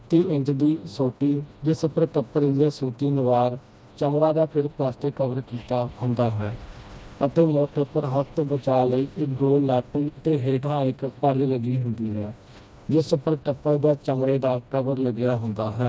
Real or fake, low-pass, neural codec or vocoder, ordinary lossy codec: fake; none; codec, 16 kHz, 1 kbps, FreqCodec, smaller model; none